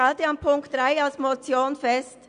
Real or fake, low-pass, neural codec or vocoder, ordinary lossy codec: real; 9.9 kHz; none; none